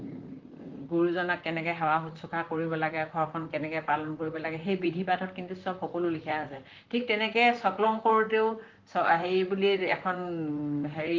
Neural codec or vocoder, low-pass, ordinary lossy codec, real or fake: vocoder, 44.1 kHz, 128 mel bands, Pupu-Vocoder; 7.2 kHz; Opus, 24 kbps; fake